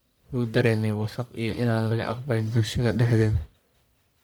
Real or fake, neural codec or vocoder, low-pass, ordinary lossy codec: fake; codec, 44.1 kHz, 1.7 kbps, Pupu-Codec; none; none